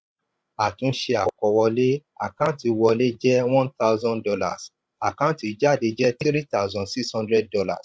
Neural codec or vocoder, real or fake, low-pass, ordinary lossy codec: codec, 16 kHz, 16 kbps, FreqCodec, larger model; fake; none; none